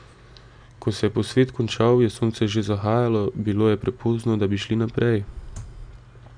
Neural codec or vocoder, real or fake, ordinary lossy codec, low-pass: none; real; none; 9.9 kHz